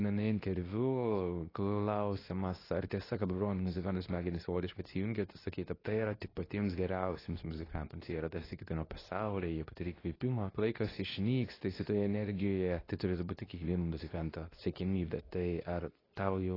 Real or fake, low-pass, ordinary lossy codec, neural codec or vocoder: fake; 5.4 kHz; AAC, 24 kbps; codec, 24 kHz, 0.9 kbps, WavTokenizer, medium speech release version 2